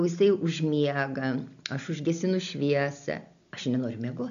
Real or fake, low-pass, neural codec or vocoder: real; 7.2 kHz; none